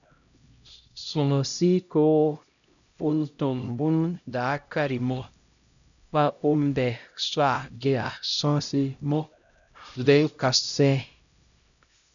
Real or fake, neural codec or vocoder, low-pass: fake; codec, 16 kHz, 0.5 kbps, X-Codec, HuBERT features, trained on LibriSpeech; 7.2 kHz